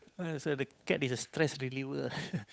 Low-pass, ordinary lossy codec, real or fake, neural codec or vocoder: none; none; fake; codec, 16 kHz, 8 kbps, FunCodec, trained on Chinese and English, 25 frames a second